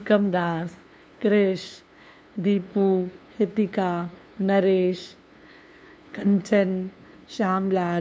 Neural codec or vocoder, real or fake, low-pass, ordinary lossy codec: codec, 16 kHz, 2 kbps, FunCodec, trained on LibriTTS, 25 frames a second; fake; none; none